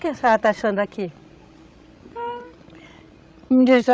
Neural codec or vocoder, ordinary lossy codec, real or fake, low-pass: codec, 16 kHz, 16 kbps, FreqCodec, larger model; none; fake; none